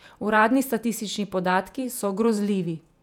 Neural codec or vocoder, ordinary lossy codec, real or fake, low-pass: vocoder, 48 kHz, 128 mel bands, Vocos; none; fake; 19.8 kHz